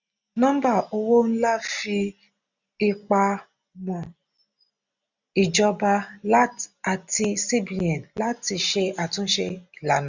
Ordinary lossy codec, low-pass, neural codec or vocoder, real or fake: none; 7.2 kHz; none; real